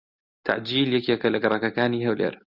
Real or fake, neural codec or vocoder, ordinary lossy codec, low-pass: real; none; Opus, 64 kbps; 5.4 kHz